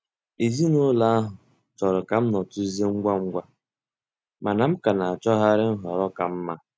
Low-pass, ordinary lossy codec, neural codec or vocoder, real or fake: none; none; none; real